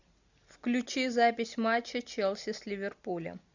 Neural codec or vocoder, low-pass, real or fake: none; 7.2 kHz; real